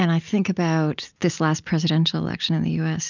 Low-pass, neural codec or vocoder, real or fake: 7.2 kHz; none; real